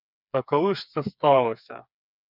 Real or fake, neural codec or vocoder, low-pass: fake; codec, 16 kHz, 4 kbps, FreqCodec, smaller model; 5.4 kHz